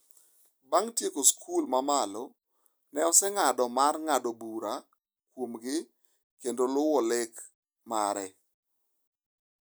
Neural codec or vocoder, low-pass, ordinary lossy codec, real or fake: none; none; none; real